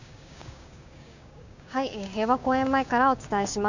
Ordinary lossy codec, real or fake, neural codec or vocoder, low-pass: none; fake; codec, 16 kHz, 6 kbps, DAC; 7.2 kHz